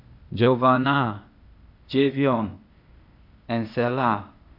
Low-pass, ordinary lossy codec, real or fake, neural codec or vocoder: 5.4 kHz; Opus, 64 kbps; fake; codec, 16 kHz, 0.8 kbps, ZipCodec